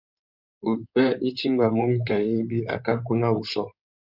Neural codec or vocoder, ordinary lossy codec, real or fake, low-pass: codec, 16 kHz in and 24 kHz out, 2.2 kbps, FireRedTTS-2 codec; Opus, 64 kbps; fake; 5.4 kHz